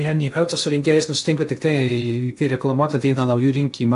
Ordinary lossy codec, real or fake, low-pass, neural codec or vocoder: AAC, 64 kbps; fake; 10.8 kHz; codec, 16 kHz in and 24 kHz out, 0.6 kbps, FocalCodec, streaming, 2048 codes